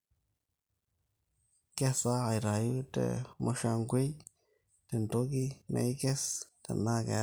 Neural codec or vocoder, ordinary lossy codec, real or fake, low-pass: none; none; real; none